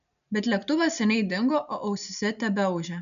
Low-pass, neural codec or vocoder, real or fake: 7.2 kHz; none; real